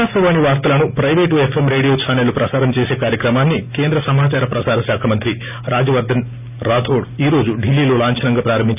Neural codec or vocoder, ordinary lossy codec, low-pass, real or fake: none; none; 3.6 kHz; real